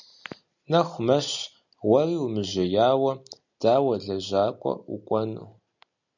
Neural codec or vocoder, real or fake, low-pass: none; real; 7.2 kHz